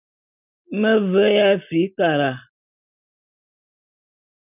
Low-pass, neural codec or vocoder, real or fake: 3.6 kHz; none; real